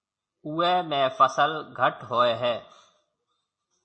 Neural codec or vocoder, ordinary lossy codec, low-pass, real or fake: none; MP3, 32 kbps; 9.9 kHz; real